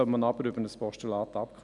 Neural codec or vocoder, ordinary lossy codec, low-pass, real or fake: none; none; 10.8 kHz; real